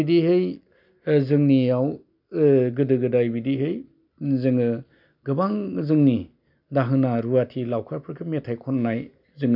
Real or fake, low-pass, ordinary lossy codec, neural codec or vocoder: real; 5.4 kHz; none; none